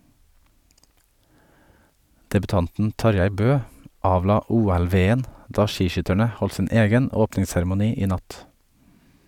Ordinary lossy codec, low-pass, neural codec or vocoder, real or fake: none; 19.8 kHz; none; real